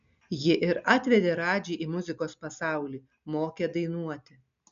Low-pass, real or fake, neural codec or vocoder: 7.2 kHz; real; none